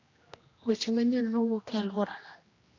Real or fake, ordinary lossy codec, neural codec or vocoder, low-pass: fake; AAC, 32 kbps; codec, 16 kHz, 1 kbps, X-Codec, HuBERT features, trained on general audio; 7.2 kHz